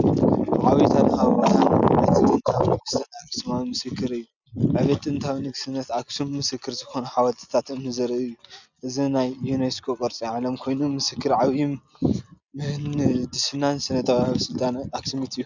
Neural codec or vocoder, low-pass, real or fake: none; 7.2 kHz; real